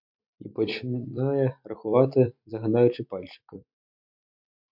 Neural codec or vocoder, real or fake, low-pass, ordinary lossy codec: vocoder, 44.1 kHz, 128 mel bands every 256 samples, BigVGAN v2; fake; 5.4 kHz; MP3, 48 kbps